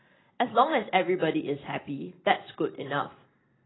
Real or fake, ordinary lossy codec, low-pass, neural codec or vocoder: real; AAC, 16 kbps; 7.2 kHz; none